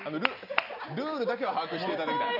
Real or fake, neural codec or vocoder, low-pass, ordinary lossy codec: real; none; 5.4 kHz; none